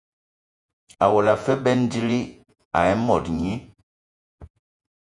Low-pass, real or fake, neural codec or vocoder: 10.8 kHz; fake; vocoder, 48 kHz, 128 mel bands, Vocos